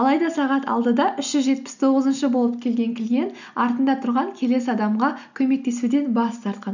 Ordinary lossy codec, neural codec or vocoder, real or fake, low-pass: none; none; real; 7.2 kHz